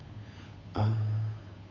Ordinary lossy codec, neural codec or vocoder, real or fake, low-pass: AAC, 32 kbps; codec, 16 kHz, 8 kbps, FunCodec, trained on Chinese and English, 25 frames a second; fake; 7.2 kHz